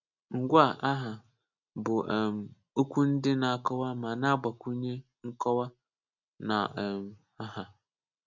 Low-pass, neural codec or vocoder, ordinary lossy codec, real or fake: 7.2 kHz; none; none; real